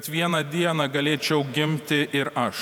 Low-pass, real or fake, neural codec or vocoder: 19.8 kHz; fake; vocoder, 48 kHz, 128 mel bands, Vocos